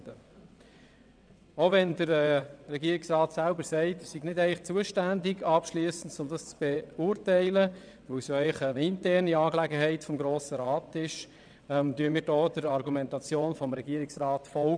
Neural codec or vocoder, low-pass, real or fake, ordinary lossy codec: vocoder, 22.05 kHz, 80 mel bands, WaveNeXt; 9.9 kHz; fake; none